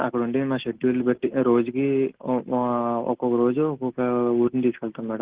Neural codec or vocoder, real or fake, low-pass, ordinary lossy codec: none; real; 3.6 kHz; Opus, 16 kbps